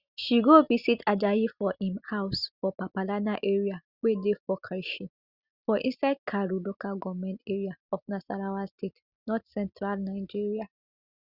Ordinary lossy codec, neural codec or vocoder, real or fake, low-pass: Opus, 64 kbps; none; real; 5.4 kHz